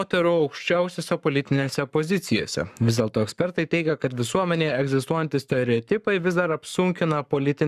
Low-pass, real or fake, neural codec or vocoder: 14.4 kHz; fake; codec, 44.1 kHz, 7.8 kbps, Pupu-Codec